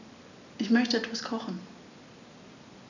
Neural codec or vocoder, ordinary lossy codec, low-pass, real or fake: none; none; 7.2 kHz; real